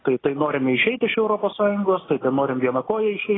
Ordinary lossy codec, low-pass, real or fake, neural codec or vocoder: AAC, 16 kbps; 7.2 kHz; real; none